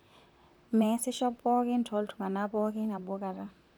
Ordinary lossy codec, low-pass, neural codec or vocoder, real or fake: none; none; vocoder, 44.1 kHz, 128 mel bands, Pupu-Vocoder; fake